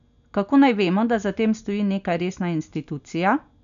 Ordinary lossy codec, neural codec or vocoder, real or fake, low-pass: none; none; real; 7.2 kHz